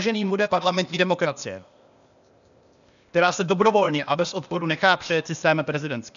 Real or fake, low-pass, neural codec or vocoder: fake; 7.2 kHz; codec, 16 kHz, 0.8 kbps, ZipCodec